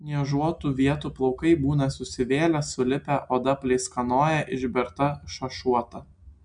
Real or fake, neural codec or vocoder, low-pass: real; none; 9.9 kHz